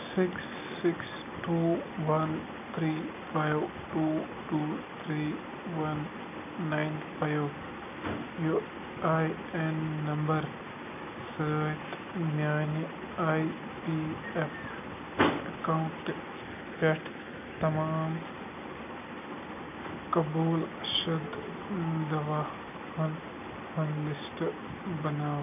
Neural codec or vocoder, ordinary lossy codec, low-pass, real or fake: none; none; 3.6 kHz; real